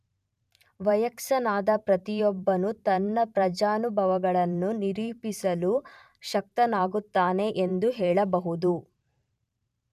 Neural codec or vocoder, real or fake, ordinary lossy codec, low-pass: vocoder, 44.1 kHz, 128 mel bands every 512 samples, BigVGAN v2; fake; none; 14.4 kHz